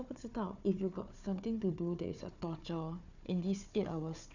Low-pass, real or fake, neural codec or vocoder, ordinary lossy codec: 7.2 kHz; fake; codec, 16 kHz, 4 kbps, FunCodec, trained on Chinese and English, 50 frames a second; none